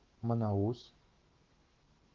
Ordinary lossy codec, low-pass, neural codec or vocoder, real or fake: Opus, 16 kbps; 7.2 kHz; autoencoder, 48 kHz, 128 numbers a frame, DAC-VAE, trained on Japanese speech; fake